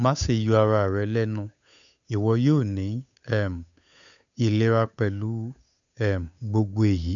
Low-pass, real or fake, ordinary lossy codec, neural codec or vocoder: 7.2 kHz; fake; none; codec, 16 kHz, 8 kbps, FunCodec, trained on Chinese and English, 25 frames a second